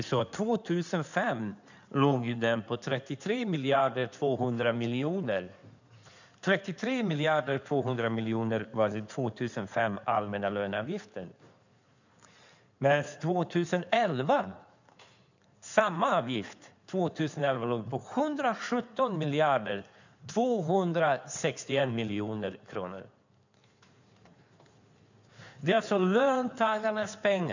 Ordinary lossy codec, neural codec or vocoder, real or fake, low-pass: none; codec, 16 kHz in and 24 kHz out, 2.2 kbps, FireRedTTS-2 codec; fake; 7.2 kHz